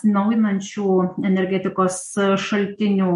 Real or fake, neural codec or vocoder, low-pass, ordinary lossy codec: real; none; 14.4 kHz; MP3, 48 kbps